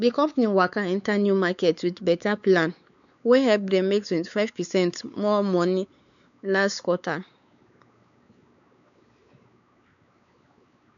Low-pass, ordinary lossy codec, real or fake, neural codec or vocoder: 7.2 kHz; none; fake; codec, 16 kHz, 4 kbps, X-Codec, WavLM features, trained on Multilingual LibriSpeech